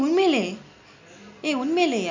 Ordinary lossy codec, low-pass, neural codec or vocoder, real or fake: MP3, 64 kbps; 7.2 kHz; none; real